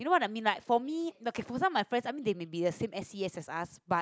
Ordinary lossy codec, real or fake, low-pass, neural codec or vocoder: none; real; none; none